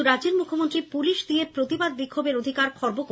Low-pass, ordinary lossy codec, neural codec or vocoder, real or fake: none; none; none; real